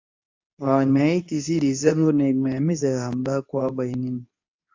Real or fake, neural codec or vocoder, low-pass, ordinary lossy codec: fake; codec, 24 kHz, 0.9 kbps, WavTokenizer, medium speech release version 2; 7.2 kHz; AAC, 48 kbps